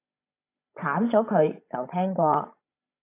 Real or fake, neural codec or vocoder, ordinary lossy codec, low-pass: fake; codec, 16 kHz, 16 kbps, FreqCodec, larger model; AAC, 24 kbps; 3.6 kHz